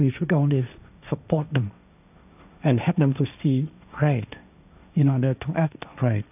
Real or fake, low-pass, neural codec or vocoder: fake; 3.6 kHz; codec, 16 kHz, 1.1 kbps, Voila-Tokenizer